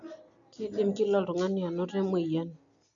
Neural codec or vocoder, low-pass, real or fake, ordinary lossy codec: none; 7.2 kHz; real; none